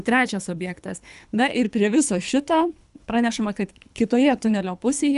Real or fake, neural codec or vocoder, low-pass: fake; codec, 24 kHz, 3 kbps, HILCodec; 10.8 kHz